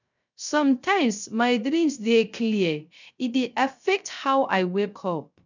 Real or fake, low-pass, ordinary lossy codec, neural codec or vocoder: fake; 7.2 kHz; none; codec, 16 kHz, 0.3 kbps, FocalCodec